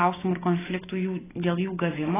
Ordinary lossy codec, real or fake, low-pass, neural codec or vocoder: AAC, 16 kbps; real; 3.6 kHz; none